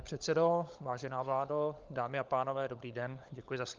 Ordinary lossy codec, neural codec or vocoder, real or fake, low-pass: Opus, 24 kbps; codec, 16 kHz, 16 kbps, FunCodec, trained on LibriTTS, 50 frames a second; fake; 7.2 kHz